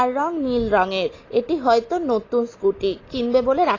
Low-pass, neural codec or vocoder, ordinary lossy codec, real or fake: 7.2 kHz; none; AAC, 32 kbps; real